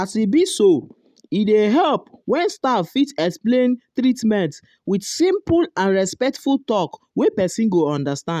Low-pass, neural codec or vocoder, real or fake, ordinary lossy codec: 14.4 kHz; none; real; none